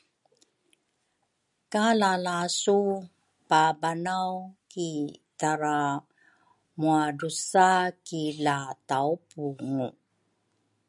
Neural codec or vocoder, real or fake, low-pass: none; real; 10.8 kHz